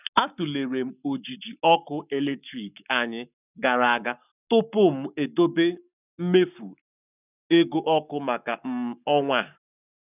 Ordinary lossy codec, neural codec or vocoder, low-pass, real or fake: none; codec, 44.1 kHz, 7.8 kbps, Pupu-Codec; 3.6 kHz; fake